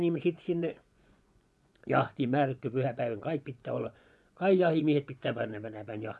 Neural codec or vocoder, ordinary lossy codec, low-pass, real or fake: vocoder, 24 kHz, 100 mel bands, Vocos; none; none; fake